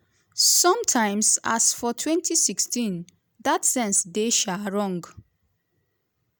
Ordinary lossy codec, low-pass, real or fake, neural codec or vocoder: none; none; real; none